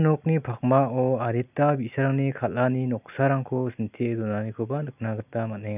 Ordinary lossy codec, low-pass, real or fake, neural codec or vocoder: none; 3.6 kHz; real; none